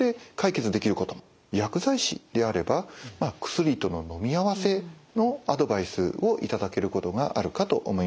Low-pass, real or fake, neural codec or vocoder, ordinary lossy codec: none; real; none; none